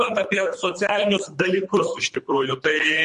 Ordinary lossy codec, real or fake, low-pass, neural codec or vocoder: MP3, 48 kbps; fake; 10.8 kHz; codec, 24 kHz, 3 kbps, HILCodec